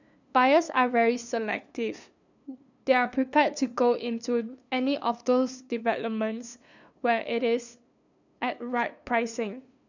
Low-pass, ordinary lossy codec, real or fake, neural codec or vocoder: 7.2 kHz; none; fake; codec, 16 kHz, 2 kbps, FunCodec, trained on LibriTTS, 25 frames a second